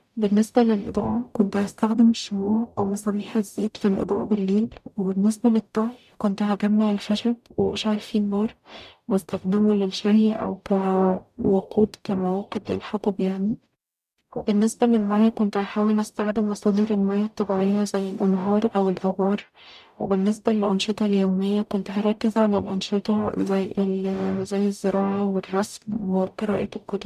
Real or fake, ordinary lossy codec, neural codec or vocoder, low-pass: fake; none; codec, 44.1 kHz, 0.9 kbps, DAC; 14.4 kHz